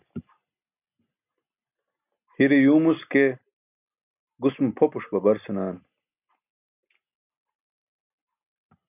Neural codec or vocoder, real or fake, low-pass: none; real; 3.6 kHz